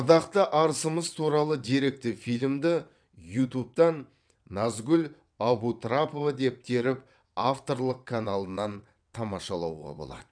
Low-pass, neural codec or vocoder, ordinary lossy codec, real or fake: 9.9 kHz; vocoder, 22.05 kHz, 80 mel bands, WaveNeXt; none; fake